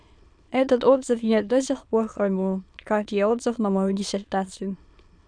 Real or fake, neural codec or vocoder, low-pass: fake; autoencoder, 22.05 kHz, a latent of 192 numbers a frame, VITS, trained on many speakers; 9.9 kHz